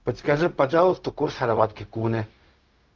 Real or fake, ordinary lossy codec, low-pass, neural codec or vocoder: fake; Opus, 24 kbps; 7.2 kHz; codec, 16 kHz, 0.4 kbps, LongCat-Audio-Codec